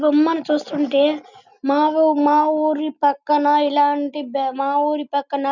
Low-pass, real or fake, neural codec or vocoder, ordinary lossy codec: 7.2 kHz; real; none; none